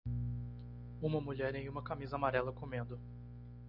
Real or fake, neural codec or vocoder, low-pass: real; none; 5.4 kHz